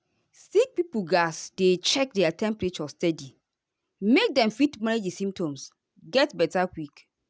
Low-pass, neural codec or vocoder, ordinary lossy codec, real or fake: none; none; none; real